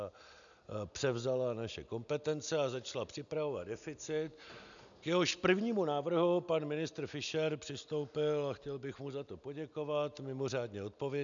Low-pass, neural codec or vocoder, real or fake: 7.2 kHz; none; real